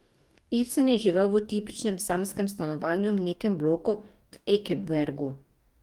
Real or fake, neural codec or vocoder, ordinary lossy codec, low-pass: fake; codec, 44.1 kHz, 2.6 kbps, DAC; Opus, 32 kbps; 19.8 kHz